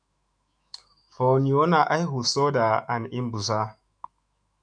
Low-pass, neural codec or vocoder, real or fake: 9.9 kHz; autoencoder, 48 kHz, 128 numbers a frame, DAC-VAE, trained on Japanese speech; fake